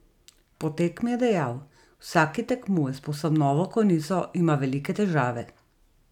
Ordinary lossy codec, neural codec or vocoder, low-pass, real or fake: none; none; 19.8 kHz; real